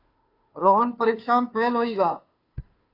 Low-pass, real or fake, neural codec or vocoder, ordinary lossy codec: 5.4 kHz; fake; codec, 16 kHz, 2 kbps, FunCodec, trained on Chinese and English, 25 frames a second; AAC, 32 kbps